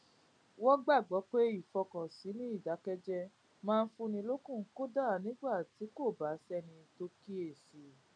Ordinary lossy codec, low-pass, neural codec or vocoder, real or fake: none; 9.9 kHz; none; real